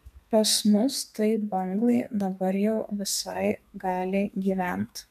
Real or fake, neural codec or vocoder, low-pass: fake; codec, 32 kHz, 1.9 kbps, SNAC; 14.4 kHz